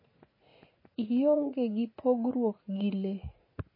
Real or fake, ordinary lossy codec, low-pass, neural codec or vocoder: fake; MP3, 24 kbps; 5.4 kHz; vocoder, 44.1 kHz, 80 mel bands, Vocos